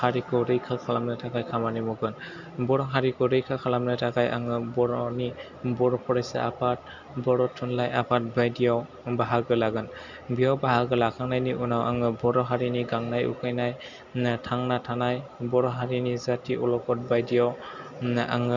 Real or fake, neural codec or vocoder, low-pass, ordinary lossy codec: real; none; 7.2 kHz; Opus, 64 kbps